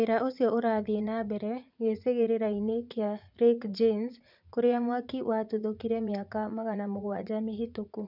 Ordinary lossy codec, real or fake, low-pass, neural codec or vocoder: none; fake; 5.4 kHz; vocoder, 44.1 kHz, 80 mel bands, Vocos